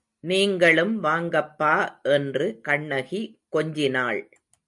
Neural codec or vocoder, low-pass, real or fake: none; 10.8 kHz; real